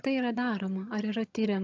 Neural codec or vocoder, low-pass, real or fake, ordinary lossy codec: vocoder, 22.05 kHz, 80 mel bands, HiFi-GAN; 7.2 kHz; fake; AAC, 48 kbps